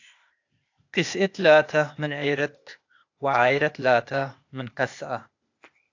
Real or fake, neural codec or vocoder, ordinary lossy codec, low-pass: fake; codec, 16 kHz, 0.8 kbps, ZipCodec; AAC, 48 kbps; 7.2 kHz